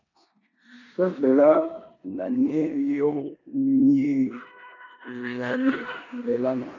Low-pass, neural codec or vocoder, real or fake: 7.2 kHz; codec, 16 kHz in and 24 kHz out, 0.9 kbps, LongCat-Audio-Codec, four codebook decoder; fake